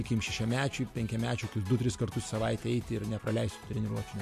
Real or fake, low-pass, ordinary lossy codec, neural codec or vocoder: real; 14.4 kHz; MP3, 64 kbps; none